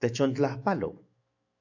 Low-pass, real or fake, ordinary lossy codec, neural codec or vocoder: 7.2 kHz; fake; AAC, 48 kbps; autoencoder, 48 kHz, 128 numbers a frame, DAC-VAE, trained on Japanese speech